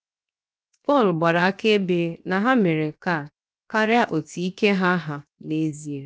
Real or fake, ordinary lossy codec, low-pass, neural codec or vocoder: fake; none; none; codec, 16 kHz, 0.7 kbps, FocalCodec